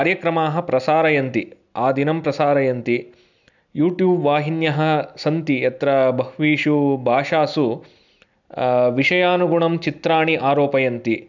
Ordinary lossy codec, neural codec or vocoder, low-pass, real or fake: none; none; 7.2 kHz; real